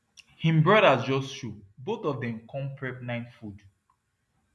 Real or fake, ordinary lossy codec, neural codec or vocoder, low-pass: real; none; none; none